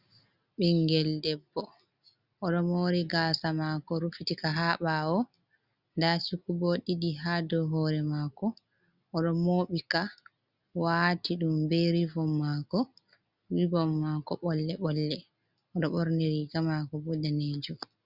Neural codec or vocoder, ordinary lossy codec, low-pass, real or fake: none; Opus, 64 kbps; 5.4 kHz; real